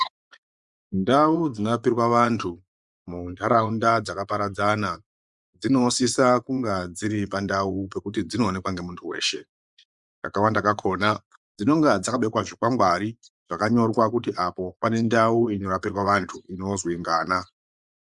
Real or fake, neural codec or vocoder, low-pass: fake; vocoder, 24 kHz, 100 mel bands, Vocos; 10.8 kHz